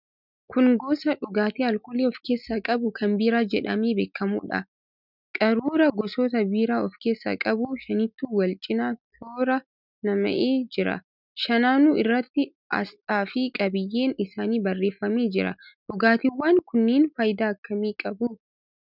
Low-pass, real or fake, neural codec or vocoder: 5.4 kHz; real; none